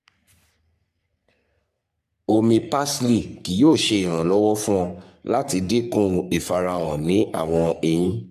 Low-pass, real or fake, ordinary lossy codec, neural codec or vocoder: 14.4 kHz; fake; AAC, 96 kbps; codec, 44.1 kHz, 3.4 kbps, Pupu-Codec